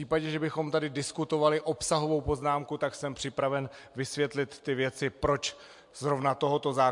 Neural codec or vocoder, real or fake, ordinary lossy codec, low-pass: none; real; MP3, 64 kbps; 10.8 kHz